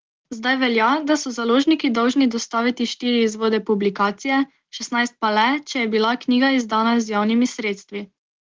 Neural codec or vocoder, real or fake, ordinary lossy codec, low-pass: none; real; Opus, 16 kbps; 7.2 kHz